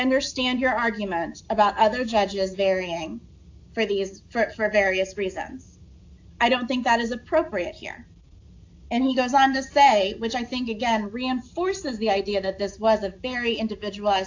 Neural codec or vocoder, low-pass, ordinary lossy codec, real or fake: none; 7.2 kHz; AAC, 48 kbps; real